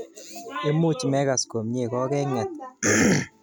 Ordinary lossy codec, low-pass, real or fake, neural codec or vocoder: none; none; real; none